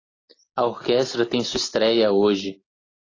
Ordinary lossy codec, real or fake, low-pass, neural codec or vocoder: AAC, 32 kbps; real; 7.2 kHz; none